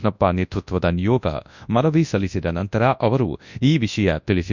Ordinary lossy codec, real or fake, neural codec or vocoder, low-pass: Opus, 64 kbps; fake; codec, 24 kHz, 0.9 kbps, WavTokenizer, large speech release; 7.2 kHz